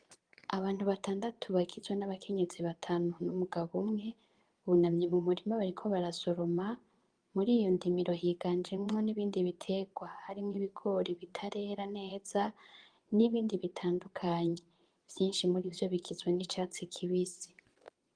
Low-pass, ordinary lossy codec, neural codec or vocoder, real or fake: 9.9 kHz; Opus, 24 kbps; vocoder, 22.05 kHz, 80 mel bands, Vocos; fake